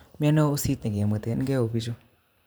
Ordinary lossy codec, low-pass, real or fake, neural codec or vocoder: none; none; fake; vocoder, 44.1 kHz, 128 mel bands every 512 samples, BigVGAN v2